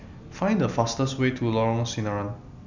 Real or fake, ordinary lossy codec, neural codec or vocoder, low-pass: real; none; none; 7.2 kHz